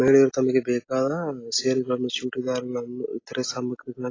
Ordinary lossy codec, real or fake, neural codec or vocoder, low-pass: AAC, 32 kbps; real; none; 7.2 kHz